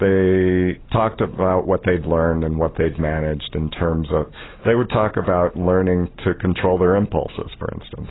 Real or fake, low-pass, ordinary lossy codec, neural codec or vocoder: real; 7.2 kHz; AAC, 16 kbps; none